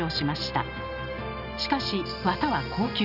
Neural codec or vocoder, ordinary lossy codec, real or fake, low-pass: none; none; real; 5.4 kHz